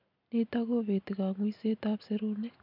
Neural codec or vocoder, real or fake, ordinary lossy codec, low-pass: none; real; none; 5.4 kHz